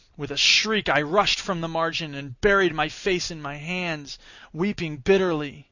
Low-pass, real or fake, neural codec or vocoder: 7.2 kHz; real; none